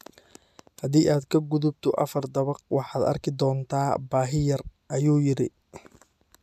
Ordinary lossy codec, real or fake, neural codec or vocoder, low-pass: none; real; none; 14.4 kHz